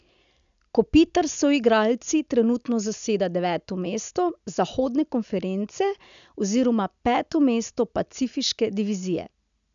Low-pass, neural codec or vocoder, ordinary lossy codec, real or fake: 7.2 kHz; none; none; real